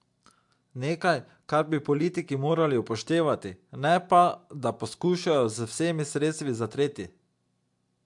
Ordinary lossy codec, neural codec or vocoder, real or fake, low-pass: MP3, 64 kbps; none; real; 10.8 kHz